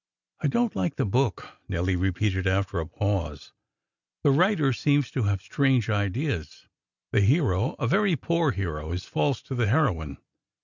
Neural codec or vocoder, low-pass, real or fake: none; 7.2 kHz; real